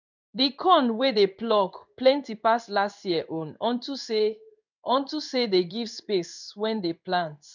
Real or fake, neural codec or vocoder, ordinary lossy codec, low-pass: fake; codec, 16 kHz in and 24 kHz out, 1 kbps, XY-Tokenizer; none; 7.2 kHz